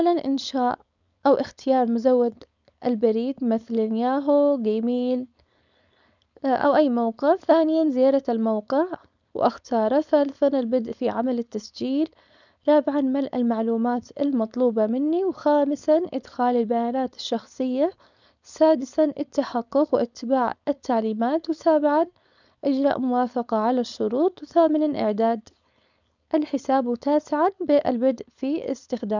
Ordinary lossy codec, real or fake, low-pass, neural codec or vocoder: none; fake; 7.2 kHz; codec, 16 kHz, 4.8 kbps, FACodec